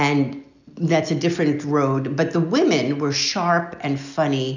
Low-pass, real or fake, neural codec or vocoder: 7.2 kHz; real; none